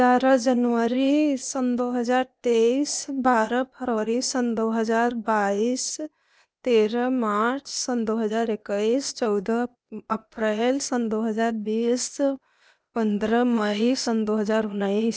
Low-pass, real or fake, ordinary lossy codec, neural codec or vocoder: none; fake; none; codec, 16 kHz, 0.8 kbps, ZipCodec